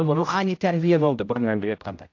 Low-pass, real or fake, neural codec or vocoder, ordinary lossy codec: 7.2 kHz; fake; codec, 16 kHz, 0.5 kbps, X-Codec, HuBERT features, trained on general audio; AAC, 48 kbps